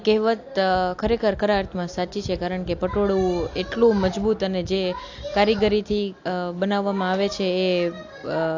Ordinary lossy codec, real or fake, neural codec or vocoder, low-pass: AAC, 48 kbps; real; none; 7.2 kHz